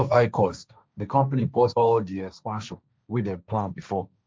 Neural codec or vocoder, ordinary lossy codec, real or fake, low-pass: codec, 16 kHz, 1.1 kbps, Voila-Tokenizer; none; fake; none